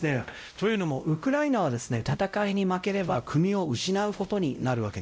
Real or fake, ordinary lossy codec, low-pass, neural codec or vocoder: fake; none; none; codec, 16 kHz, 0.5 kbps, X-Codec, WavLM features, trained on Multilingual LibriSpeech